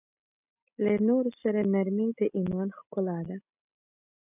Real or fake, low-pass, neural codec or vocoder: real; 3.6 kHz; none